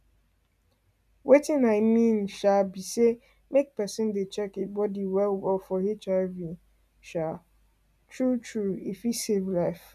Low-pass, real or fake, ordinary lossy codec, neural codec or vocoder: 14.4 kHz; real; none; none